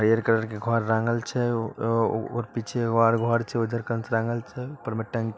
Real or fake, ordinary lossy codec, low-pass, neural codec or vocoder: real; none; none; none